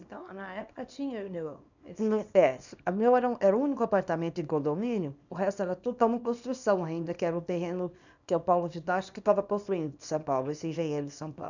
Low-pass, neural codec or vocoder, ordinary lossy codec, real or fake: 7.2 kHz; codec, 24 kHz, 0.9 kbps, WavTokenizer, small release; none; fake